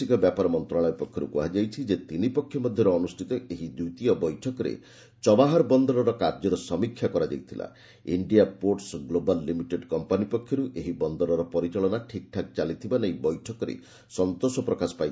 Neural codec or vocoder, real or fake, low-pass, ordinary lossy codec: none; real; none; none